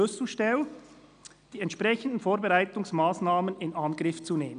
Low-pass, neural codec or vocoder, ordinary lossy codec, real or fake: 9.9 kHz; none; none; real